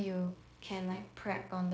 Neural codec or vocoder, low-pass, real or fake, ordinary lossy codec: codec, 16 kHz, 0.9 kbps, LongCat-Audio-Codec; none; fake; none